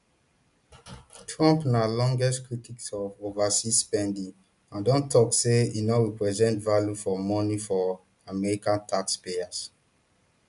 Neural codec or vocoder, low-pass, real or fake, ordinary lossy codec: none; 10.8 kHz; real; none